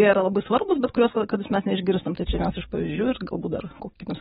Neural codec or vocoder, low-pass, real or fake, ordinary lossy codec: codec, 44.1 kHz, 7.8 kbps, DAC; 19.8 kHz; fake; AAC, 16 kbps